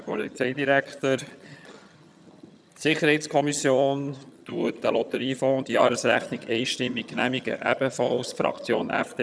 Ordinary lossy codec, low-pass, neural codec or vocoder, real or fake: none; none; vocoder, 22.05 kHz, 80 mel bands, HiFi-GAN; fake